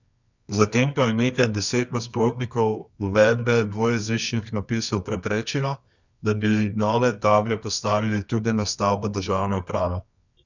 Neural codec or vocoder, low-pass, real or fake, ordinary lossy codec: codec, 24 kHz, 0.9 kbps, WavTokenizer, medium music audio release; 7.2 kHz; fake; none